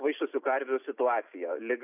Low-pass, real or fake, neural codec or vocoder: 3.6 kHz; real; none